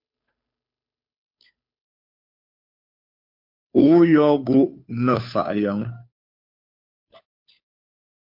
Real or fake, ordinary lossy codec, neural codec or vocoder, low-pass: fake; MP3, 48 kbps; codec, 16 kHz, 2 kbps, FunCodec, trained on Chinese and English, 25 frames a second; 5.4 kHz